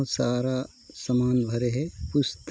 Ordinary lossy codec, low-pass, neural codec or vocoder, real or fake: none; none; none; real